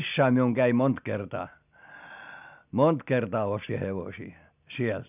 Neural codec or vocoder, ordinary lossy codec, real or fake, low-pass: none; none; real; 3.6 kHz